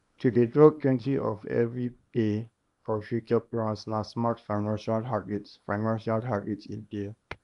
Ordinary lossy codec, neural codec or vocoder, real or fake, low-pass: none; codec, 24 kHz, 0.9 kbps, WavTokenizer, small release; fake; 10.8 kHz